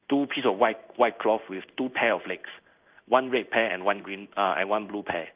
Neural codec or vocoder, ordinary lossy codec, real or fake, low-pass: codec, 16 kHz in and 24 kHz out, 1 kbps, XY-Tokenizer; Opus, 24 kbps; fake; 3.6 kHz